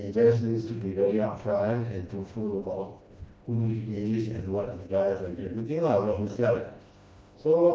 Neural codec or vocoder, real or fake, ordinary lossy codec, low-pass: codec, 16 kHz, 1 kbps, FreqCodec, smaller model; fake; none; none